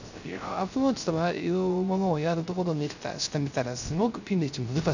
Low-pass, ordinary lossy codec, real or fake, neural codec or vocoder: 7.2 kHz; none; fake; codec, 16 kHz, 0.3 kbps, FocalCodec